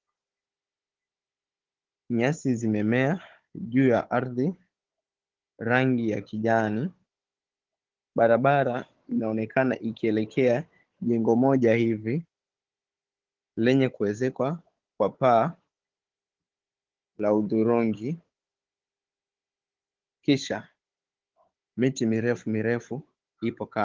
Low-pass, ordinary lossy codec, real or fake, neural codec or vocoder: 7.2 kHz; Opus, 16 kbps; fake; codec, 16 kHz, 16 kbps, FunCodec, trained on Chinese and English, 50 frames a second